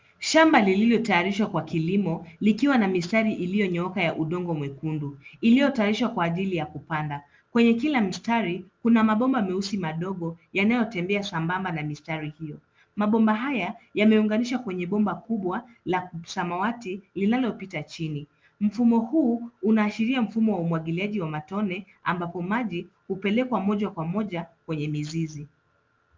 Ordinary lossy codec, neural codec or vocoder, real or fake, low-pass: Opus, 24 kbps; none; real; 7.2 kHz